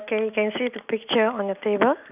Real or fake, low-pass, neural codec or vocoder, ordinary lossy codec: real; 3.6 kHz; none; none